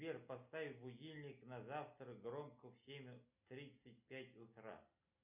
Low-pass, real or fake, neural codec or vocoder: 3.6 kHz; real; none